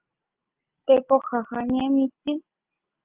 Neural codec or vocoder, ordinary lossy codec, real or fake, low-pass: none; Opus, 32 kbps; real; 3.6 kHz